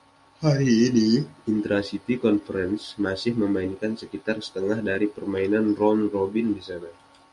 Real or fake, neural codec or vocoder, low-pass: real; none; 10.8 kHz